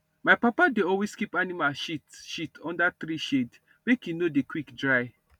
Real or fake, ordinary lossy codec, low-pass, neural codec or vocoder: real; none; 19.8 kHz; none